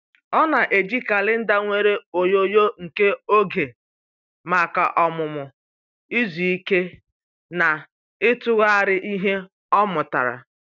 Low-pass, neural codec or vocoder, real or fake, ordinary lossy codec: 7.2 kHz; none; real; none